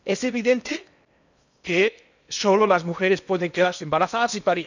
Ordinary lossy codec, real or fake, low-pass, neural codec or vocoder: none; fake; 7.2 kHz; codec, 16 kHz in and 24 kHz out, 0.8 kbps, FocalCodec, streaming, 65536 codes